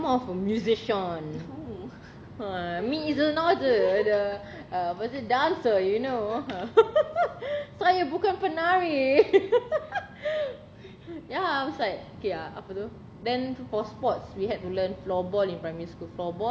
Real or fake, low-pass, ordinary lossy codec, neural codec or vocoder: real; none; none; none